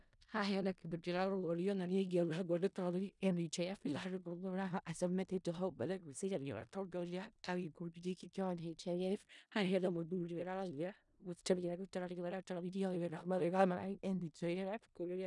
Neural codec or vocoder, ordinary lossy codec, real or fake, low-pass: codec, 16 kHz in and 24 kHz out, 0.4 kbps, LongCat-Audio-Codec, four codebook decoder; none; fake; 10.8 kHz